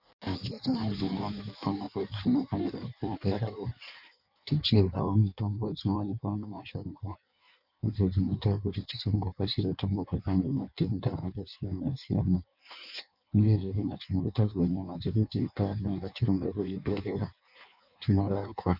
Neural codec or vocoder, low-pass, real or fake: codec, 16 kHz in and 24 kHz out, 1.1 kbps, FireRedTTS-2 codec; 5.4 kHz; fake